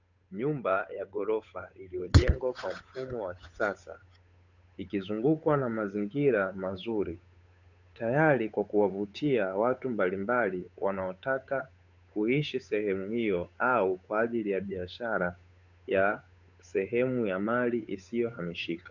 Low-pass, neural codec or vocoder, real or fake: 7.2 kHz; codec, 16 kHz, 8 kbps, FunCodec, trained on Chinese and English, 25 frames a second; fake